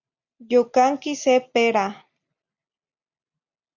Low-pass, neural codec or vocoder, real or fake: 7.2 kHz; none; real